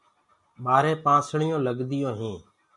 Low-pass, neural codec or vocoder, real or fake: 10.8 kHz; none; real